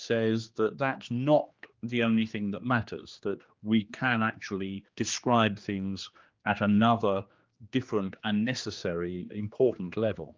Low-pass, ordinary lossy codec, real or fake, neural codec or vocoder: 7.2 kHz; Opus, 24 kbps; fake; codec, 16 kHz, 2 kbps, X-Codec, HuBERT features, trained on general audio